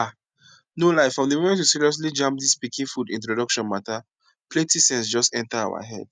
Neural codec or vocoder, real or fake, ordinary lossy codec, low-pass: none; real; none; 9.9 kHz